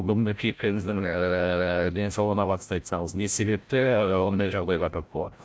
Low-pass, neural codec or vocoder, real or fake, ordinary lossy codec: none; codec, 16 kHz, 0.5 kbps, FreqCodec, larger model; fake; none